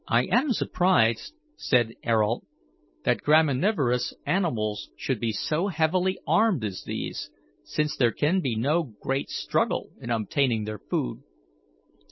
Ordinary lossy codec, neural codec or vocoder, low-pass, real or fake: MP3, 24 kbps; none; 7.2 kHz; real